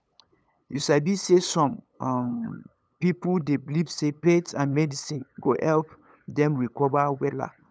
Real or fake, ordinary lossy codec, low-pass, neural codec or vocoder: fake; none; none; codec, 16 kHz, 8 kbps, FunCodec, trained on LibriTTS, 25 frames a second